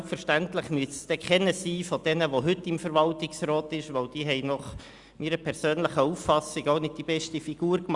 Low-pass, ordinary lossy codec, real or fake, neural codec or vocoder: 10.8 kHz; Opus, 64 kbps; real; none